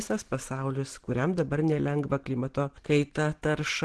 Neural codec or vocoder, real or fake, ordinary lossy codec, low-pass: none; real; Opus, 16 kbps; 10.8 kHz